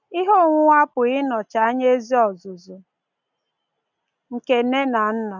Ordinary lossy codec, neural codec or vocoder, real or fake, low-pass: none; none; real; 7.2 kHz